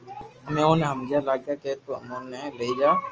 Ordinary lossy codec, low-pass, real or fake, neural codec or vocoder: Opus, 24 kbps; 7.2 kHz; real; none